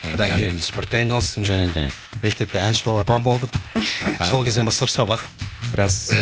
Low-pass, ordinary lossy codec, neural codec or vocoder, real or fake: none; none; codec, 16 kHz, 0.8 kbps, ZipCodec; fake